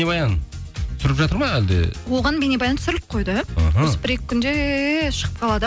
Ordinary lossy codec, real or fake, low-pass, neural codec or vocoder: none; real; none; none